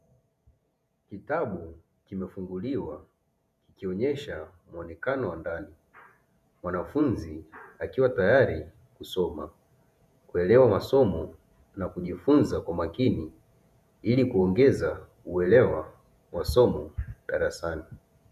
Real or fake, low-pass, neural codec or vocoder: fake; 14.4 kHz; vocoder, 48 kHz, 128 mel bands, Vocos